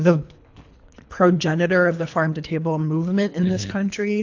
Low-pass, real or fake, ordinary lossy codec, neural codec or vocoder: 7.2 kHz; fake; AAC, 48 kbps; codec, 24 kHz, 3 kbps, HILCodec